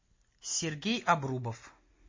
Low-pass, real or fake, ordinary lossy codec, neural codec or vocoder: 7.2 kHz; real; MP3, 32 kbps; none